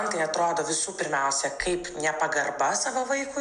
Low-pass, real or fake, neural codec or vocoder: 9.9 kHz; real; none